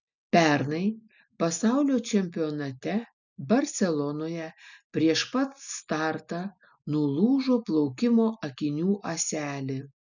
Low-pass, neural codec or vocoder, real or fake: 7.2 kHz; none; real